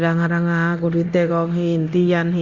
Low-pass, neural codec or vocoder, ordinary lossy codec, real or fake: 7.2 kHz; codec, 24 kHz, 0.9 kbps, DualCodec; Opus, 64 kbps; fake